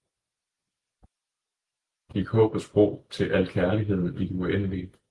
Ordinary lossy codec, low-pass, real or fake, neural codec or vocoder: Opus, 24 kbps; 10.8 kHz; real; none